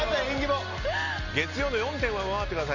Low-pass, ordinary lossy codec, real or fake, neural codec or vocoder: 7.2 kHz; none; real; none